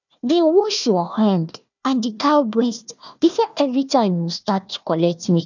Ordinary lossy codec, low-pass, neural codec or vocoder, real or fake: none; 7.2 kHz; codec, 16 kHz, 1 kbps, FunCodec, trained on Chinese and English, 50 frames a second; fake